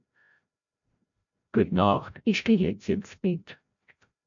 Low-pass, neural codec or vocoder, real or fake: 7.2 kHz; codec, 16 kHz, 0.5 kbps, FreqCodec, larger model; fake